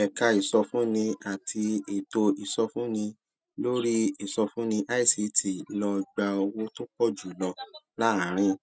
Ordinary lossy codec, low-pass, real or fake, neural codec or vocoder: none; none; real; none